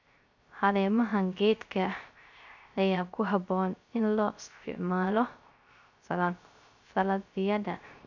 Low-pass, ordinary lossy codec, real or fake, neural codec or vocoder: 7.2 kHz; none; fake; codec, 16 kHz, 0.3 kbps, FocalCodec